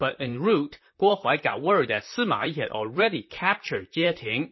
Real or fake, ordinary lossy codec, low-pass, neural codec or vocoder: fake; MP3, 24 kbps; 7.2 kHz; vocoder, 44.1 kHz, 128 mel bands, Pupu-Vocoder